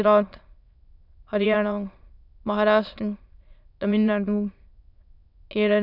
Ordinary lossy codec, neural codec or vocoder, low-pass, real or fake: none; autoencoder, 22.05 kHz, a latent of 192 numbers a frame, VITS, trained on many speakers; 5.4 kHz; fake